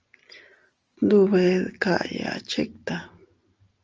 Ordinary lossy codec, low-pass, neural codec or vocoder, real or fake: Opus, 24 kbps; 7.2 kHz; none; real